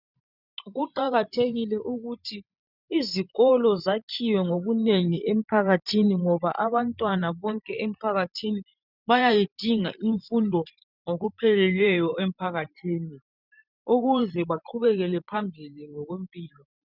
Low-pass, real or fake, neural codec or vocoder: 5.4 kHz; fake; vocoder, 44.1 kHz, 128 mel bands every 512 samples, BigVGAN v2